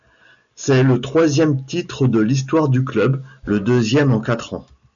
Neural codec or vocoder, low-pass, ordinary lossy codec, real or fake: none; 7.2 kHz; MP3, 96 kbps; real